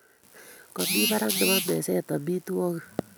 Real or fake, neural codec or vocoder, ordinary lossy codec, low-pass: real; none; none; none